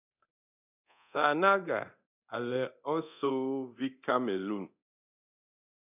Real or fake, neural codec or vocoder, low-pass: fake; codec, 24 kHz, 0.9 kbps, DualCodec; 3.6 kHz